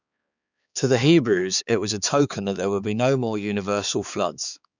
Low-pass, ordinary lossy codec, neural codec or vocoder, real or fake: 7.2 kHz; none; codec, 16 kHz, 4 kbps, X-Codec, HuBERT features, trained on balanced general audio; fake